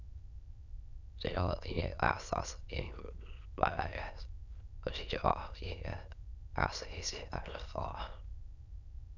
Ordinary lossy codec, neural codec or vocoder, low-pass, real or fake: none; autoencoder, 22.05 kHz, a latent of 192 numbers a frame, VITS, trained on many speakers; 7.2 kHz; fake